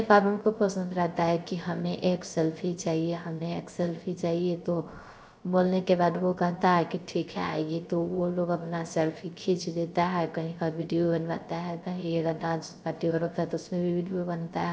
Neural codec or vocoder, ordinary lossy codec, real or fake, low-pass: codec, 16 kHz, 0.3 kbps, FocalCodec; none; fake; none